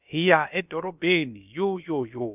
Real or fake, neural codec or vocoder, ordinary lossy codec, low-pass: fake; codec, 16 kHz, about 1 kbps, DyCAST, with the encoder's durations; none; 3.6 kHz